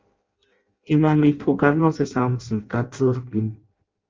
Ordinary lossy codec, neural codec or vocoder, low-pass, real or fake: Opus, 32 kbps; codec, 16 kHz in and 24 kHz out, 0.6 kbps, FireRedTTS-2 codec; 7.2 kHz; fake